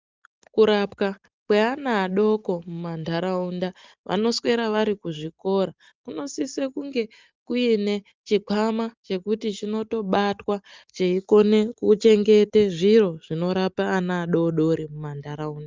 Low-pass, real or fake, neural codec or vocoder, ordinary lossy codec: 7.2 kHz; real; none; Opus, 24 kbps